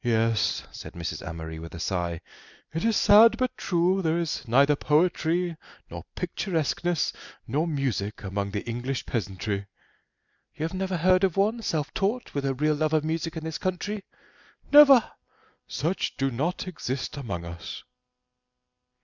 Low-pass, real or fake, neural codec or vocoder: 7.2 kHz; real; none